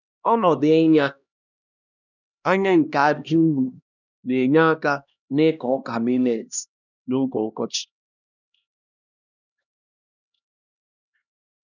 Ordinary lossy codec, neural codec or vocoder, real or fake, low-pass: none; codec, 16 kHz, 1 kbps, X-Codec, HuBERT features, trained on LibriSpeech; fake; 7.2 kHz